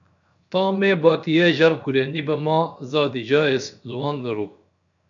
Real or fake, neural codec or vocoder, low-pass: fake; codec, 16 kHz, 0.7 kbps, FocalCodec; 7.2 kHz